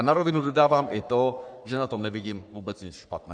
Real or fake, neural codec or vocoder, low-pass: fake; codec, 44.1 kHz, 3.4 kbps, Pupu-Codec; 9.9 kHz